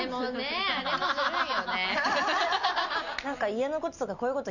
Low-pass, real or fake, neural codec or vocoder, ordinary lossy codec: 7.2 kHz; real; none; none